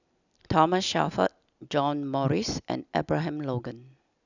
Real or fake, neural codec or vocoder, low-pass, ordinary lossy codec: real; none; 7.2 kHz; none